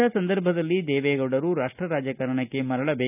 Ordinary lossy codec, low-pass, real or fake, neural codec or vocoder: none; 3.6 kHz; real; none